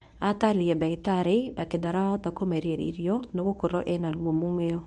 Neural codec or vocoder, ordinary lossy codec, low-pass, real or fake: codec, 24 kHz, 0.9 kbps, WavTokenizer, medium speech release version 1; none; none; fake